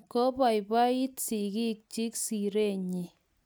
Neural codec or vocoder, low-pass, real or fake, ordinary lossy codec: none; none; real; none